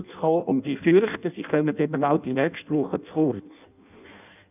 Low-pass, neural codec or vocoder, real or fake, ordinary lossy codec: 3.6 kHz; codec, 16 kHz in and 24 kHz out, 0.6 kbps, FireRedTTS-2 codec; fake; none